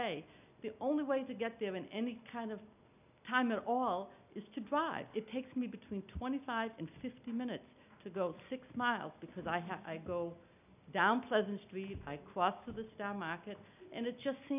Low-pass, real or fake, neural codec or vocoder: 3.6 kHz; real; none